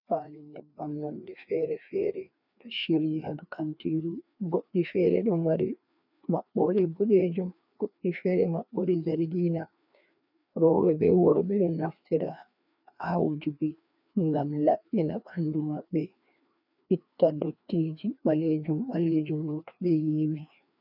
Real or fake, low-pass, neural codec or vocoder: fake; 5.4 kHz; codec, 16 kHz, 2 kbps, FreqCodec, larger model